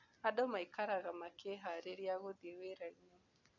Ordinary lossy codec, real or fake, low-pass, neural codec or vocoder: none; real; 7.2 kHz; none